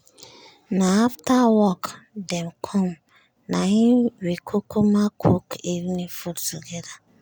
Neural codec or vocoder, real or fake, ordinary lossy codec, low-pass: none; real; none; none